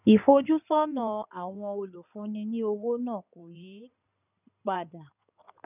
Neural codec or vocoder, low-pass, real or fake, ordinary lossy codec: codec, 16 kHz in and 24 kHz out, 2.2 kbps, FireRedTTS-2 codec; 3.6 kHz; fake; none